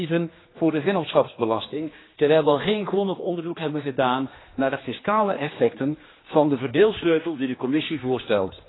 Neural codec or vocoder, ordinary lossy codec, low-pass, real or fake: codec, 16 kHz, 2 kbps, X-Codec, HuBERT features, trained on general audio; AAC, 16 kbps; 7.2 kHz; fake